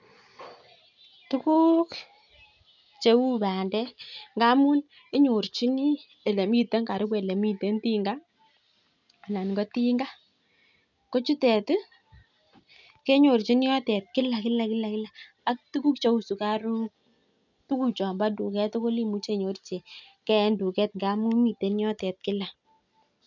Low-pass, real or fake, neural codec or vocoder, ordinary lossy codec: 7.2 kHz; real; none; none